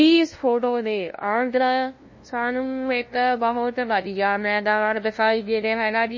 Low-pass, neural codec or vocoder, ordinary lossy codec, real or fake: 7.2 kHz; codec, 16 kHz, 0.5 kbps, FunCodec, trained on LibriTTS, 25 frames a second; MP3, 32 kbps; fake